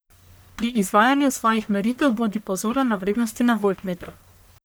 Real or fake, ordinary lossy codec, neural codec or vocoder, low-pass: fake; none; codec, 44.1 kHz, 1.7 kbps, Pupu-Codec; none